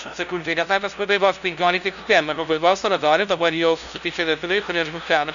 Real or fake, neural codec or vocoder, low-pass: fake; codec, 16 kHz, 0.5 kbps, FunCodec, trained on LibriTTS, 25 frames a second; 7.2 kHz